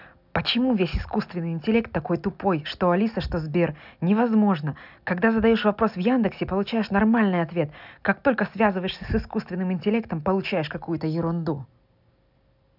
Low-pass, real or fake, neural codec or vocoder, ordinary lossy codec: 5.4 kHz; real; none; none